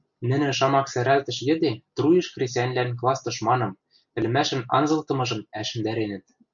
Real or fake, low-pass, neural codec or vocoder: real; 7.2 kHz; none